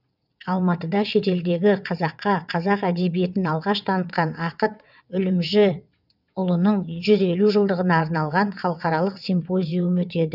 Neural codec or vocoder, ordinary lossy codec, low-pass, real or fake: vocoder, 22.05 kHz, 80 mel bands, WaveNeXt; none; 5.4 kHz; fake